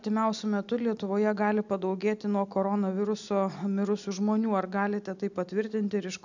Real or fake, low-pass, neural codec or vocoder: real; 7.2 kHz; none